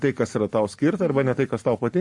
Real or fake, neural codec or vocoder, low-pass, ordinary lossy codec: real; none; 10.8 kHz; MP3, 48 kbps